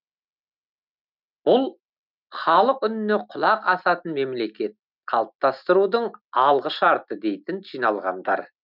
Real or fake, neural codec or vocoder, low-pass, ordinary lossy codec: real; none; 5.4 kHz; none